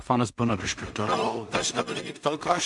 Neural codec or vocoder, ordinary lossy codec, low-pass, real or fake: codec, 16 kHz in and 24 kHz out, 0.4 kbps, LongCat-Audio-Codec, two codebook decoder; MP3, 64 kbps; 10.8 kHz; fake